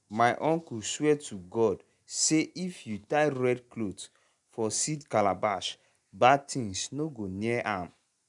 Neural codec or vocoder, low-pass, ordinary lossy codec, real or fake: none; 10.8 kHz; none; real